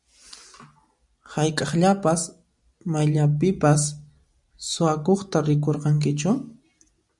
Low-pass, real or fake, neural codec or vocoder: 10.8 kHz; real; none